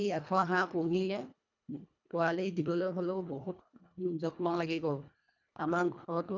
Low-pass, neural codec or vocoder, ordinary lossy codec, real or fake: 7.2 kHz; codec, 24 kHz, 1.5 kbps, HILCodec; none; fake